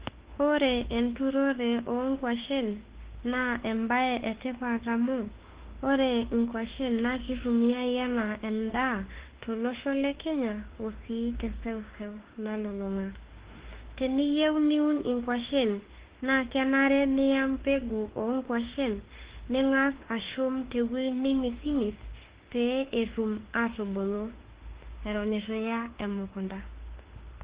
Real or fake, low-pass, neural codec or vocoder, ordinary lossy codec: fake; 3.6 kHz; autoencoder, 48 kHz, 32 numbers a frame, DAC-VAE, trained on Japanese speech; Opus, 16 kbps